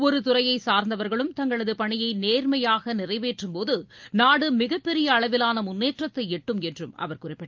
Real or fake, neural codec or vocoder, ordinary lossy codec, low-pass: real; none; Opus, 24 kbps; 7.2 kHz